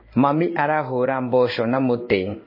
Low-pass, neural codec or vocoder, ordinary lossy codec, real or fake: 5.4 kHz; codec, 16 kHz in and 24 kHz out, 1 kbps, XY-Tokenizer; MP3, 24 kbps; fake